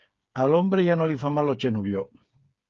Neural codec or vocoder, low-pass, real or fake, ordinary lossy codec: codec, 16 kHz, 8 kbps, FreqCodec, smaller model; 7.2 kHz; fake; Opus, 32 kbps